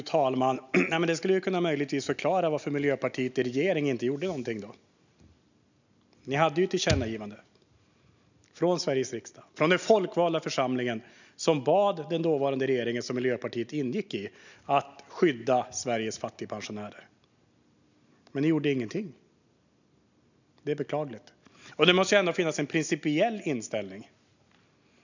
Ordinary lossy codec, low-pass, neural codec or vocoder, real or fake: none; 7.2 kHz; none; real